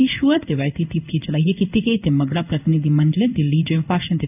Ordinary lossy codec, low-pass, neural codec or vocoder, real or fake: none; 3.6 kHz; codec, 16 kHz in and 24 kHz out, 1 kbps, XY-Tokenizer; fake